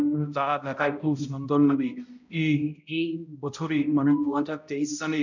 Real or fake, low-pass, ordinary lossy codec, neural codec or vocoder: fake; 7.2 kHz; none; codec, 16 kHz, 0.5 kbps, X-Codec, HuBERT features, trained on balanced general audio